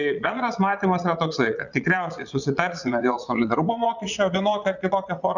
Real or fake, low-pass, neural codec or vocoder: fake; 7.2 kHz; vocoder, 22.05 kHz, 80 mel bands, Vocos